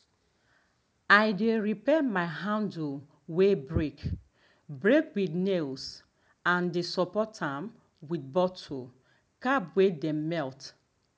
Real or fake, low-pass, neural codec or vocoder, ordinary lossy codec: real; none; none; none